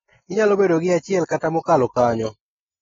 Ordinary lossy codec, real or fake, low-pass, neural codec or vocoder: AAC, 24 kbps; real; 7.2 kHz; none